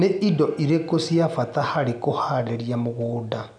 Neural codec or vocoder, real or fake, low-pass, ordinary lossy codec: none; real; 9.9 kHz; none